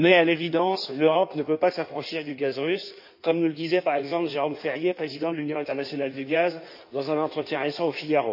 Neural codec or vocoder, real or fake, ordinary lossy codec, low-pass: codec, 16 kHz in and 24 kHz out, 1.1 kbps, FireRedTTS-2 codec; fake; MP3, 32 kbps; 5.4 kHz